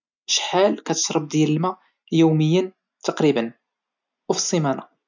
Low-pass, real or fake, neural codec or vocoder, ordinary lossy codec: 7.2 kHz; real; none; none